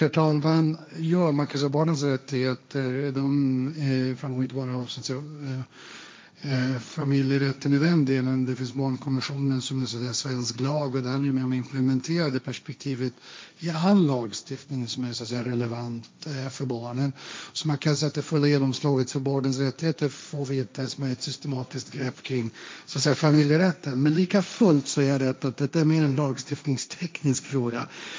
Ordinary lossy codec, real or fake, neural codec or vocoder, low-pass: none; fake; codec, 16 kHz, 1.1 kbps, Voila-Tokenizer; none